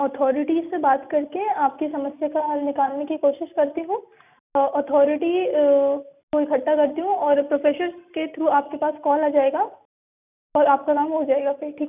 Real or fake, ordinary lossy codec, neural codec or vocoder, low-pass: real; none; none; 3.6 kHz